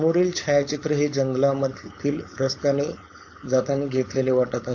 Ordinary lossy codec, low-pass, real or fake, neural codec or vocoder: AAC, 48 kbps; 7.2 kHz; fake; codec, 16 kHz, 4.8 kbps, FACodec